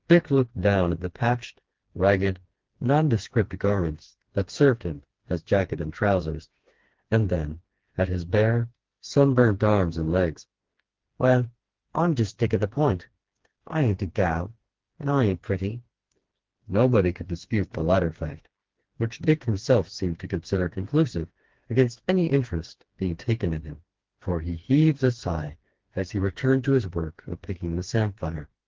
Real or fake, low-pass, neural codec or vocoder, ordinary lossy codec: fake; 7.2 kHz; codec, 16 kHz, 2 kbps, FreqCodec, smaller model; Opus, 16 kbps